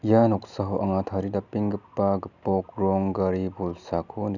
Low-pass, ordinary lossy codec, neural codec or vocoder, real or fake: 7.2 kHz; none; none; real